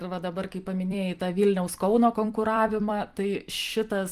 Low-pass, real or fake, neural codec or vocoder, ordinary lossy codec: 14.4 kHz; fake; vocoder, 44.1 kHz, 128 mel bands every 256 samples, BigVGAN v2; Opus, 24 kbps